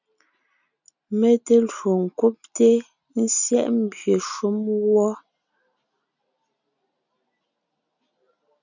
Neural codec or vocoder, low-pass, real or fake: none; 7.2 kHz; real